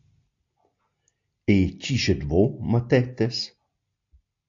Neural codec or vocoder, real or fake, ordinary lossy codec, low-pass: none; real; AAC, 48 kbps; 7.2 kHz